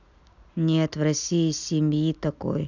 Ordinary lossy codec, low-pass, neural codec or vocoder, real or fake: none; 7.2 kHz; none; real